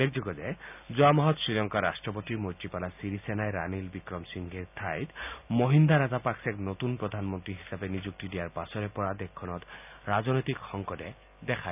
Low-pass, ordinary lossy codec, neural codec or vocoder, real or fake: 3.6 kHz; none; none; real